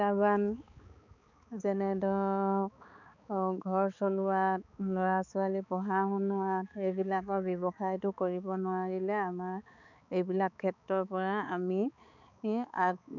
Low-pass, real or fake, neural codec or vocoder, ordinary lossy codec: 7.2 kHz; fake; codec, 16 kHz, 4 kbps, X-Codec, HuBERT features, trained on balanced general audio; none